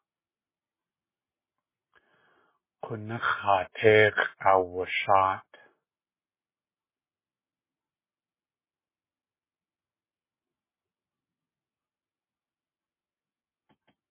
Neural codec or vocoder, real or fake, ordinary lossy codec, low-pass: none; real; MP3, 16 kbps; 3.6 kHz